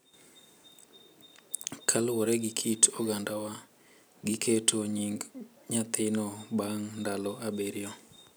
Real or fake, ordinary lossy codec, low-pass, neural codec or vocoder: real; none; none; none